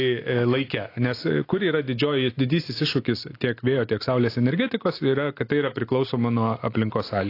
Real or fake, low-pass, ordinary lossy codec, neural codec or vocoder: real; 5.4 kHz; AAC, 32 kbps; none